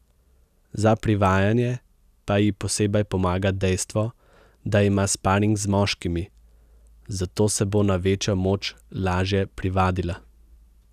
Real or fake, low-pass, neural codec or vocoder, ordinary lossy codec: real; 14.4 kHz; none; none